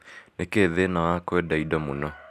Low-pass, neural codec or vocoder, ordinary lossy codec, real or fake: 14.4 kHz; none; none; real